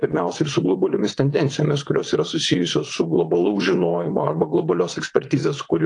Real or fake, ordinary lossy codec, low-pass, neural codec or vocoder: fake; AAC, 48 kbps; 9.9 kHz; vocoder, 22.05 kHz, 80 mel bands, WaveNeXt